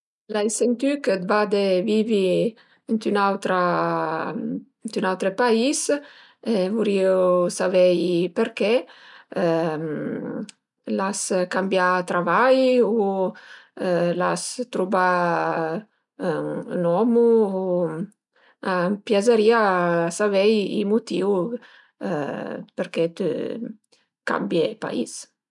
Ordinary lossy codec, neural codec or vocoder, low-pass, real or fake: none; none; 10.8 kHz; real